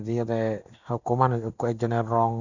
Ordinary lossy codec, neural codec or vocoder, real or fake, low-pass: none; none; real; 7.2 kHz